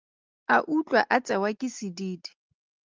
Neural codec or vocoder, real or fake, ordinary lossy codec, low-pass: none; real; Opus, 24 kbps; 7.2 kHz